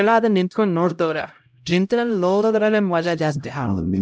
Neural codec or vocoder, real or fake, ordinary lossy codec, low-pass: codec, 16 kHz, 0.5 kbps, X-Codec, HuBERT features, trained on LibriSpeech; fake; none; none